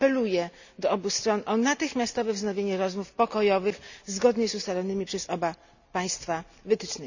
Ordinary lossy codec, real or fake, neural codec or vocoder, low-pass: none; real; none; 7.2 kHz